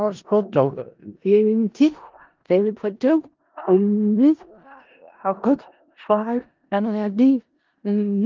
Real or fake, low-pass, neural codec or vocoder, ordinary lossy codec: fake; 7.2 kHz; codec, 16 kHz in and 24 kHz out, 0.4 kbps, LongCat-Audio-Codec, four codebook decoder; Opus, 32 kbps